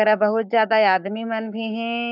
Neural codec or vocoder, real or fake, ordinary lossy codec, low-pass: codec, 44.1 kHz, 7.8 kbps, Pupu-Codec; fake; none; 5.4 kHz